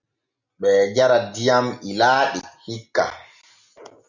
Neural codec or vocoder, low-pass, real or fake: none; 7.2 kHz; real